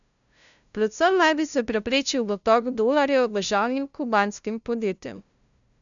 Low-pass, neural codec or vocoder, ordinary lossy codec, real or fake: 7.2 kHz; codec, 16 kHz, 0.5 kbps, FunCodec, trained on LibriTTS, 25 frames a second; none; fake